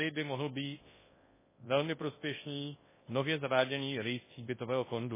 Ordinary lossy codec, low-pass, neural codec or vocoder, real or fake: MP3, 16 kbps; 3.6 kHz; codec, 24 kHz, 0.9 kbps, WavTokenizer, large speech release; fake